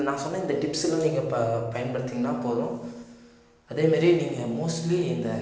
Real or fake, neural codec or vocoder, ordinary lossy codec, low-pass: real; none; none; none